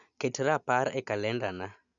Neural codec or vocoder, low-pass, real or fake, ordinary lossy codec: none; 7.2 kHz; real; none